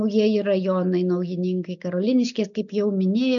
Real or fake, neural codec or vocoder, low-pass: real; none; 7.2 kHz